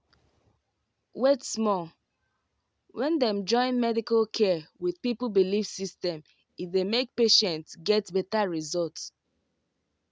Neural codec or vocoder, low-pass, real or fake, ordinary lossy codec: none; none; real; none